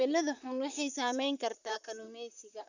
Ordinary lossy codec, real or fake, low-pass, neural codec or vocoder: none; fake; 7.2 kHz; vocoder, 44.1 kHz, 128 mel bands, Pupu-Vocoder